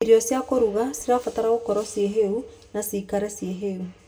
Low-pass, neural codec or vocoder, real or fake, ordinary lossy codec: none; none; real; none